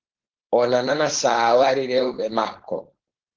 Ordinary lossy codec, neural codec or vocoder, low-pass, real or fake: Opus, 16 kbps; codec, 16 kHz, 4.8 kbps, FACodec; 7.2 kHz; fake